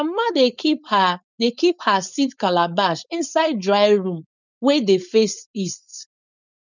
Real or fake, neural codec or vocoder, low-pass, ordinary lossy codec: fake; codec, 16 kHz, 4.8 kbps, FACodec; 7.2 kHz; none